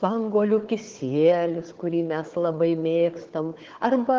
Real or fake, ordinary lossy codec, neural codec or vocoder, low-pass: fake; Opus, 16 kbps; codec, 16 kHz, 4 kbps, FunCodec, trained on Chinese and English, 50 frames a second; 7.2 kHz